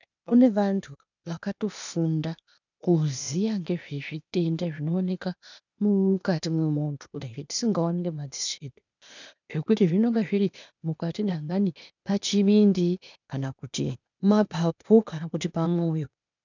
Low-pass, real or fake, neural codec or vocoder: 7.2 kHz; fake; codec, 16 kHz, 0.8 kbps, ZipCodec